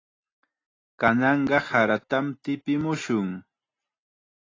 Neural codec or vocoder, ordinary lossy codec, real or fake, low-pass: none; AAC, 32 kbps; real; 7.2 kHz